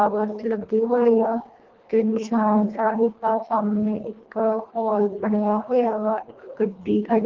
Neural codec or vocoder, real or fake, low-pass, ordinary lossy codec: codec, 24 kHz, 1.5 kbps, HILCodec; fake; 7.2 kHz; Opus, 16 kbps